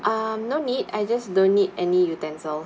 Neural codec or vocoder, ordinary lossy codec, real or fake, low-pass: none; none; real; none